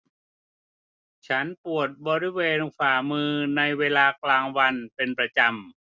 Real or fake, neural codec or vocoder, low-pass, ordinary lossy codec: real; none; none; none